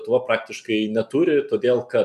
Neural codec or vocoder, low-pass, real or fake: none; 14.4 kHz; real